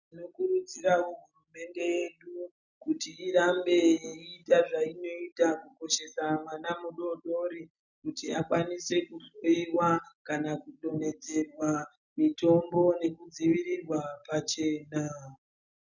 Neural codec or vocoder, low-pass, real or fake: none; 7.2 kHz; real